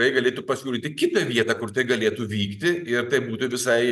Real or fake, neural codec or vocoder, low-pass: real; none; 14.4 kHz